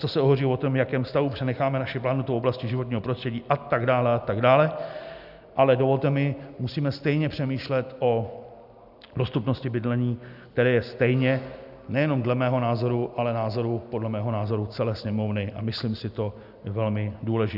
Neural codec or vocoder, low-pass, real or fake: none; 5.4 kHz; real